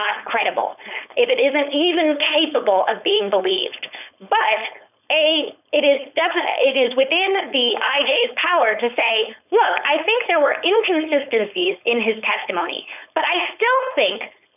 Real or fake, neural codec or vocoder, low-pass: fake; codec, 16 kHz, 4.8 kbps, FACodec; 3.6 kHz